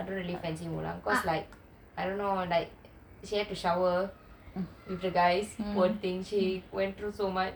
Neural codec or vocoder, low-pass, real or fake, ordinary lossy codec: none; none; real; none